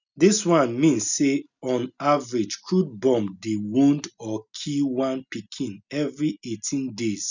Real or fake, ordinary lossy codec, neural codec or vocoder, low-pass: real; none; none; 7.2 kHz